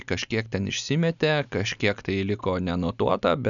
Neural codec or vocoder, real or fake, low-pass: none; real; 7.2 kHz